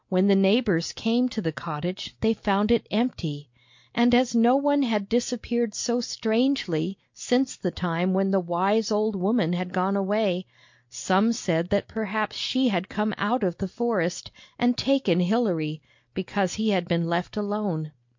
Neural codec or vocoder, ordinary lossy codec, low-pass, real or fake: none; MP3, 48 kbps; 7.2 kHz; real